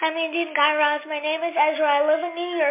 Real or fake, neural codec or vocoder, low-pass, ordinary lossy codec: real; none; 3.6 kHz; MP3, 32 kbps